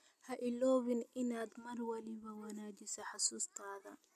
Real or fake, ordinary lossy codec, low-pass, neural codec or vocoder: real; none; 14.4 kHz; none